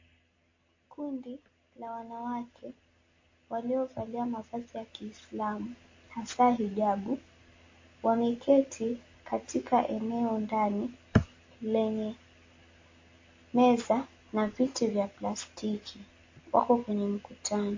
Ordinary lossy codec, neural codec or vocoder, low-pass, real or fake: MP3, 32 kbps; none; 7.2 kHz; real